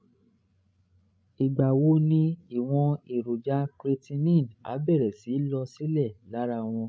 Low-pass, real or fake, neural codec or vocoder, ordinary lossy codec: none; fake; codec, 16 kHz, 16 kbps, FreqCodec, larger model; none